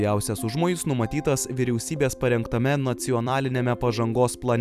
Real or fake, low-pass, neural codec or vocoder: fake; 14.4 kHz; vocoder, 44.1 kHz, 128 mel bands every 256 samples, BigVGAN v2